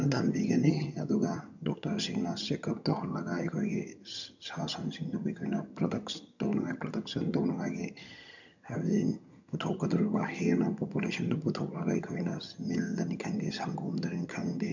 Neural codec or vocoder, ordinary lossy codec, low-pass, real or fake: vocoder, 22.05 kHz, 80 mel bands, HiFi-GAN; none; 7.2 kHz; fake